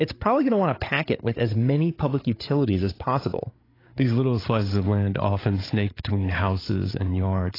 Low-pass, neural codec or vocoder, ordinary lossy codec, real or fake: 5.4 kHz; codec, 16 kHz, 8 kbps, FreqCodec, larger model; AAC, 24 kbps; fake